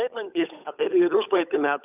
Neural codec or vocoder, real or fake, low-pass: codec, 16 kHz, 8 kbps, FunCodec, trained on Chinese and English, 25 frames a second; fake; 3.6 kHz